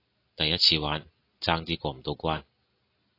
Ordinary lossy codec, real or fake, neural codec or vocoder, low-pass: AAC, 32 kbps; real; none; 5.4 kHz